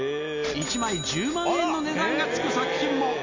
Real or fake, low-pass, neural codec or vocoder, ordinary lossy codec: real; 7.2 kHz; none; MP3, 48 kbps